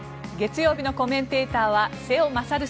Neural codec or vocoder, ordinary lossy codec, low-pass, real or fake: none; none; none; real